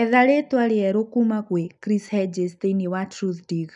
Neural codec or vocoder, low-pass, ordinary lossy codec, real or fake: none; 10.8 kHz; none; real